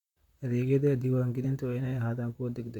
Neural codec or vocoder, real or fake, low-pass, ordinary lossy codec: vocoder, 44.1 kHz, 128 mel bands, Pupu-Vocoder; fake; 19.8 kHz; none